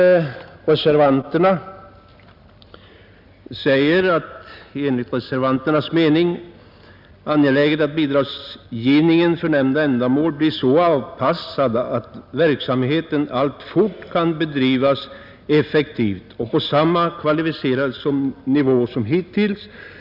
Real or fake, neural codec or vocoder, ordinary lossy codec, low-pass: real; none; none; 5.4 kHz